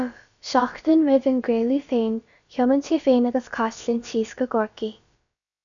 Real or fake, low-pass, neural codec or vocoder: fake; 7.2 kHz; codec, 16 kHz, about 1 kbps, DyCAST, with the encoder's durations